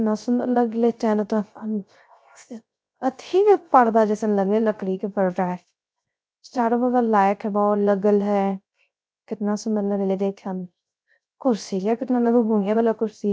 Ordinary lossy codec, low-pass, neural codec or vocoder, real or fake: none; none; codec, 16 kHz, 0.3 kbps, FocalCodec; fake